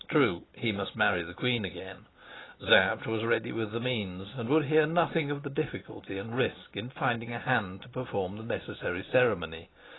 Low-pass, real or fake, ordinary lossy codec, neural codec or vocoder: 7.2 kHz; real; AAC, 16 kbps; none